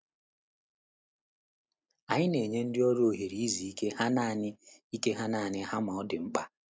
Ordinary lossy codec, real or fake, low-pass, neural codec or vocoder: none; real; none; none